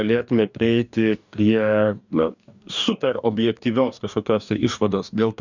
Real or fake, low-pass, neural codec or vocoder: fake; 7.2 kHz; codec, 44.1 kHz, 2.6 kbps, DAC